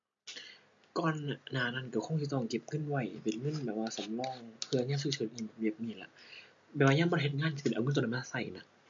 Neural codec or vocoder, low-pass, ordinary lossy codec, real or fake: none; 7.2 kHz; MP3, 48 kbps; real